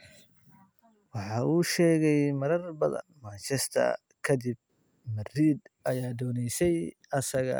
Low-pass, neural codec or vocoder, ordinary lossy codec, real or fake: none; none; none; real